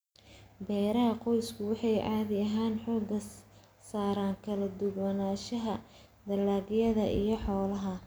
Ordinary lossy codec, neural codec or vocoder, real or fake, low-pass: none; none; real; none